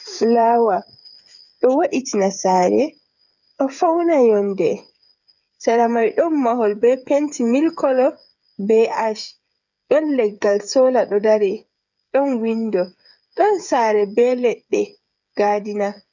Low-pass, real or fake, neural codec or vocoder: 7.2 kHz; fake; codec, 16 kHz, 8 kbps, FreqCodec, smaller model